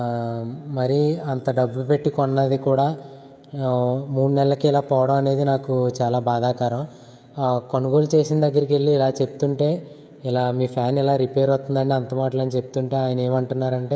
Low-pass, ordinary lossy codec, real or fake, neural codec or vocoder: none; none; fake; codec, 16 kHz, 16 kbps, FreqCodec, smaller model